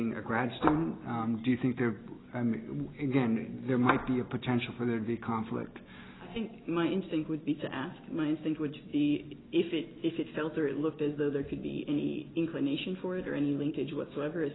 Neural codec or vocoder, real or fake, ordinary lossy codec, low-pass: none; real; AAC, 16 kbps; 7.2 kHz